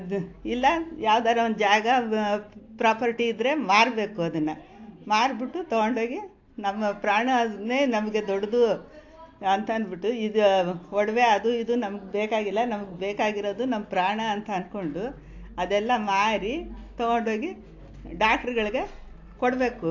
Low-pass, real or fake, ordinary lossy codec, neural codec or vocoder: 7.2 kHz; real; AAC, 48 kbps; none